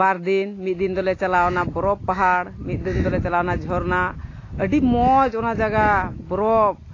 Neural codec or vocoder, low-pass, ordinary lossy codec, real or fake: none; 7.2 kHz; AAC, 32 kbps; real